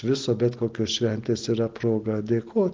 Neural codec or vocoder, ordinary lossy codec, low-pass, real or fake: none; Opus, 16 kbps; 7.2 kHz; real